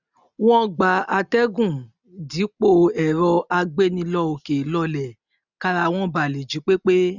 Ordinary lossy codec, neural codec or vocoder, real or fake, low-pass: none; none; real; 7.2 kHz